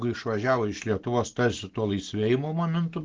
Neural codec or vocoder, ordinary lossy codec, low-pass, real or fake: none; Opus, 16 kbps; 7.2 kHz; real